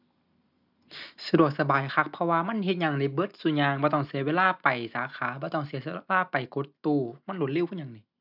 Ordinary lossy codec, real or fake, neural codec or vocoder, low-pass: none; real; none; 5.4 kHz